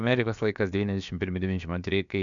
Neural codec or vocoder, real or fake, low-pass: codec, 16 kHz, about 1 kbps, DyCAST, with the encoder's durations; fake; 7.2 kHz